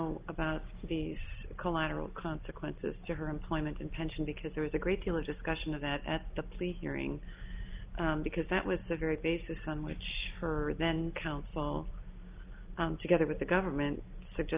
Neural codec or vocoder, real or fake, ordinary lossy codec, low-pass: none; real; Opus, 24 kbps; 3.6 kHz